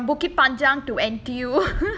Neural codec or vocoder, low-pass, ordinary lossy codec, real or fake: none; none; none; real